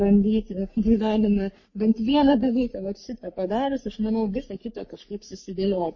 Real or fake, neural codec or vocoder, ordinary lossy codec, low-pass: fake; codec, 44.1 kHz, 3.4 kbps, Pupu-Codec; MP3, 32 kbps; 7.2 kHz